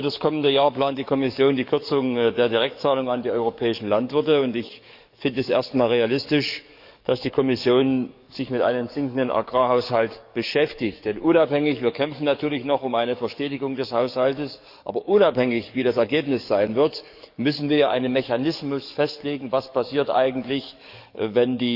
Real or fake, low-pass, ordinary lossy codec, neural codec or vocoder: fake; 5.4 kHz; none; codec, 44.1 kHz, 7.8 kbps, DAC